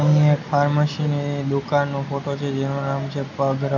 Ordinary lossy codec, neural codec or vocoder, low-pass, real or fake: none; none; 7.2 kHz; real